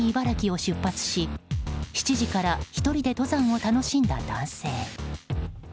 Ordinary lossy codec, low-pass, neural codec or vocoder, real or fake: none; none; none; real